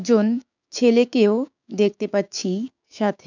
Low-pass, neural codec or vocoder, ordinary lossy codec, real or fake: 7.2 kHz; codec, 16 kHz, 6 kbps, DAC; none; fake